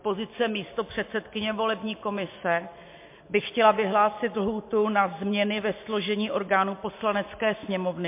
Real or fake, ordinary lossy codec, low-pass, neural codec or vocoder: real; MP3, 24 kbps; 3.6 kHz; none